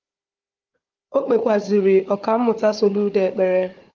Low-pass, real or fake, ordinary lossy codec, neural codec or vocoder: 7.2 kHz; fake; Opus, 32 kbps; codec, 16 kHz, 4 kbps, FunCodec, trained on Chinese and English, 50 frames a second